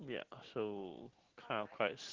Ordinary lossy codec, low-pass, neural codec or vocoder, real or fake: Opus, 16 kbps; 7.2 kHz; none; real